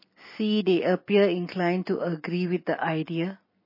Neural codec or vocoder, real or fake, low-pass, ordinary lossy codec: none; real; 5.4 kHz; MP3, 24 kbps